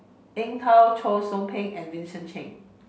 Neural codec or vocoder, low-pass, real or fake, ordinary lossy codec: none; none; real; none